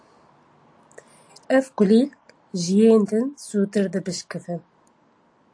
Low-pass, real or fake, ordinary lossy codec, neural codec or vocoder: 9.9 kHz; real; AAC, 48 kbps; none